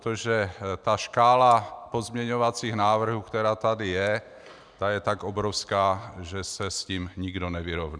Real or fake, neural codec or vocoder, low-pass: real; none; 9.9 kHz